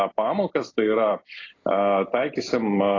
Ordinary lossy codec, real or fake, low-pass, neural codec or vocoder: AAC, 32 kbps; real; 7.2 kHz; none